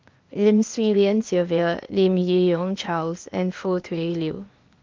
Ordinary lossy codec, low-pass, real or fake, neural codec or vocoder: Opus, 24 kbps; 7.2 kHz; fake; codec, 16 kHz, 0.8 kbps, ZipCodec